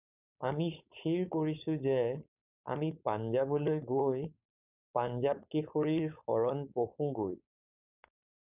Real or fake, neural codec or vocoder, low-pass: fake; codec, 16 kHz, 4.8 kbps, FACodec; 3.6 kHz